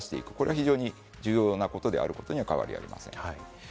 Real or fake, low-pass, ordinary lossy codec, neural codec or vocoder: real; none; none; none